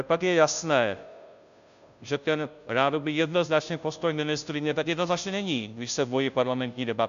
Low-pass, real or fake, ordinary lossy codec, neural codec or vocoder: 7.2 kHz; fake; MP3, 96 kbps; codec, 16 kHz, 0.5 kbps, FunCodec, trained on Chinese and English, 25 frames a second